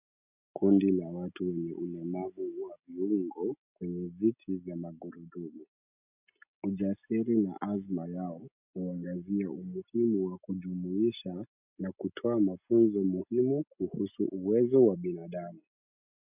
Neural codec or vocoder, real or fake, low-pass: none; real; 3.6 kHz